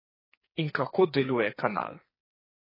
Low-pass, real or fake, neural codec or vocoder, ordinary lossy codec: 5.4 kHz; fake; codec, 44.1 kHz, 2.6 kbps, SNAC; MP3, 24 kbps